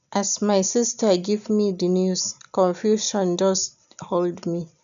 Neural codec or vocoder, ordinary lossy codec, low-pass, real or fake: none; none; 7.2 kHz; real